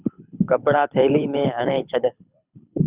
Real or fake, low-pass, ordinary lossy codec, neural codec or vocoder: fake; 3.6 kHz; Opus, 64 kbps; codec, 24 kHz, 6 kbps, HILCodec